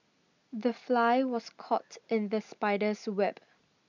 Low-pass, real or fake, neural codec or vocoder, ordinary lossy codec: 7.2 kHz; real; none; none